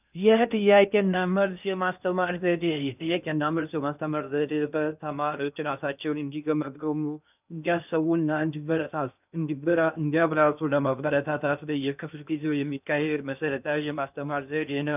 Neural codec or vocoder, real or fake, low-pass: codec, 16 kHz in and 24 kHz out, 0.6 kbps, FocalCodec, streaming, 2048 codes; fake; 3.6 kHz